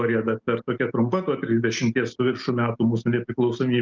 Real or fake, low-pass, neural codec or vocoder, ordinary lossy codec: real; 7.2 kHz; none; Opus, 32 kbps